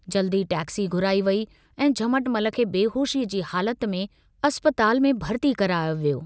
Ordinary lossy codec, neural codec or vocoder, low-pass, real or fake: none; none; none; real